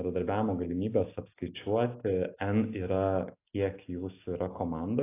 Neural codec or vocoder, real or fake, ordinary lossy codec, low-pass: none; real; AAC, 24 kbps; 3.6 kHz